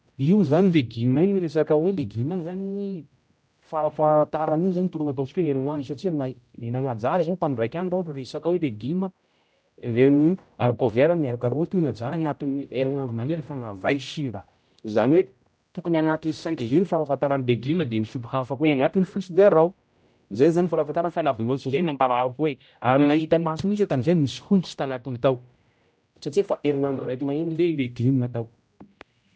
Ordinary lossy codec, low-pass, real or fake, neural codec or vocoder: none; none; fake; codec, 16 kHz, 0.5 kbps, X-Codec, HuBERT features, trained on general audio